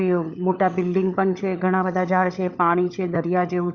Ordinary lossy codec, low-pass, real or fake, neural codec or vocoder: Opus, 64 kbps; 7.2 kHz; fake; codec, 16 kHz, 16 kbps, FunCodec, trained on LibriTTS, 50 frames a second